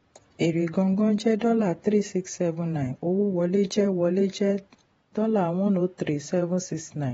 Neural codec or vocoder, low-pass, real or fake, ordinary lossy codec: vocoder, 48 kHz, 128 mel bands, Vocos; 19.8 kHz; fake; AAC, 24 kbps